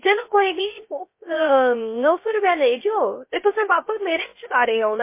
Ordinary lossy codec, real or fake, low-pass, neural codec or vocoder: MP3, 24 kbps; fake; 3.6 kHz; codec, 16 kHz, 0.3 kbps, FocalCodec